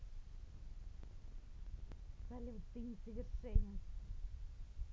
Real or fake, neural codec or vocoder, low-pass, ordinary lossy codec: real; none; none; none